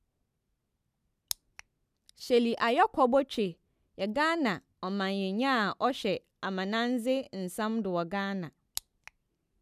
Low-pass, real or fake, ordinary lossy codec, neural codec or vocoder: 14.4 kHz; real; none; none